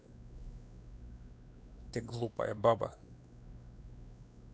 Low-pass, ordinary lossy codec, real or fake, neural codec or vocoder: none; none; fake; codec, 16 kHz, 2 kbps, X-Codec, WavLM features, trained on Multilingual LibriSpeech